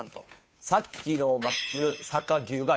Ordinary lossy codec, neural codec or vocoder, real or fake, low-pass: none; codec, 16 kHz, 2 kbps, FunCodec, trained on Chinese and English, 25 frames a second; fake; none